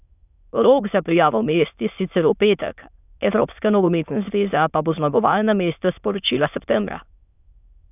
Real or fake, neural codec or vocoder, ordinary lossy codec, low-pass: fake; autoencoder, 22.05 kHz, a latent of 192 numbers a frame, VITS, trained on many speakers; none; 3.6 kHz